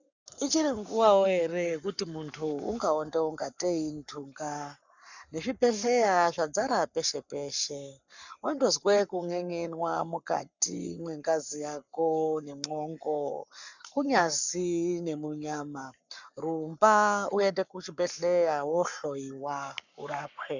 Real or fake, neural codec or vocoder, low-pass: fake; codec, 44.1 kHz, 7.8 kbps, Pupu-Codec; 7.2 kHz